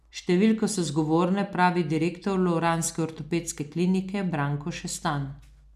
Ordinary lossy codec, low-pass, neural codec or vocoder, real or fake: none; 14.4 kHz; none; real